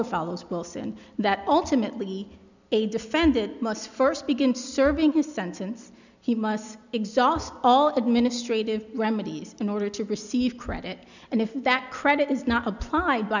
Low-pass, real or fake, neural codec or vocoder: 7.2 kHz; real; none